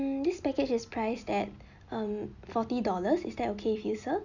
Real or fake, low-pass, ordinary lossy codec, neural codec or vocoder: real; 7.2 kHz; none; none